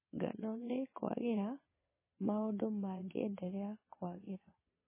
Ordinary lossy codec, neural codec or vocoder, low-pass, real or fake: MP3, 16 kbps; vocoder, 22.05 kHz, 80 mel bands, Vocos; 3.6 kHz; fake